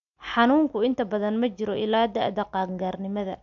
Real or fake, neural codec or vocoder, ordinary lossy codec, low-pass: real; none; AAC, 64 kbps; 7.2 kHz